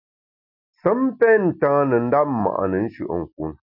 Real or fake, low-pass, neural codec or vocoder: real; 5.4 kHz; none